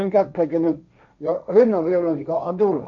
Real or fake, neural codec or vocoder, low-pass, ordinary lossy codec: fake; codec, 16 kHz, 1.1 kbps, Voila-Tokenizer; 7.2 kHz; none